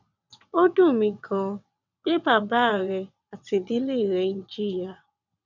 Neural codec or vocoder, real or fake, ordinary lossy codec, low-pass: vocoder, 22.05 kHz, 80 mel bands, WaveNeXt; fake; none; 7.2 kHz